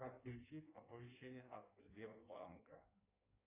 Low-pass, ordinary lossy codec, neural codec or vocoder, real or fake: 3.6 kHz; AAC, 16 kbps; codec, 16 kHz in and 24 kHz out, 1.1 kbps, FireRedTTS-2 codec; fake